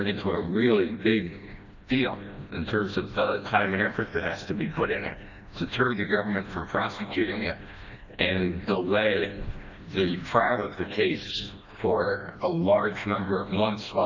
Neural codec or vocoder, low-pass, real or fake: codec, 16 kHz, 1 kbps, FreqCodec, smaller model; 7.2 kHz; fake